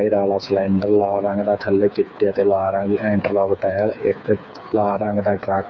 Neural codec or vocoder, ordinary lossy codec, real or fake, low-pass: codec, 16 kHz, 4 kbps, FreqCodec, smaller model; none; fake; 7.2 kHz